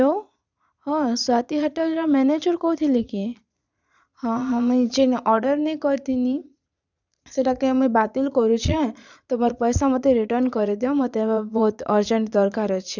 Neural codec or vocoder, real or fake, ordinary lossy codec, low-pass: vocoder, 22.05 kHz, 80 mel bands, Vocos; fake; none; 7.2 kHz